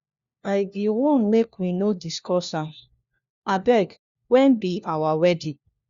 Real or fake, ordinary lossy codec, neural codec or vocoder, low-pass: fake; Opus, 64 kbps; codec, 16 kHz, 1 kbps, FunCodec, trained on LibriTTS, 50 frames a second; 7.2 kHz